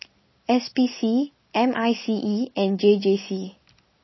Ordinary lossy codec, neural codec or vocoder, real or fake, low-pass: MP3, 24 kbps; none; real; 7.2 kHz